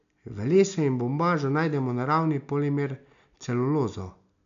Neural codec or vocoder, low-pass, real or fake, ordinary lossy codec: none; 7.2 kHz; real; AAC, 96 kbps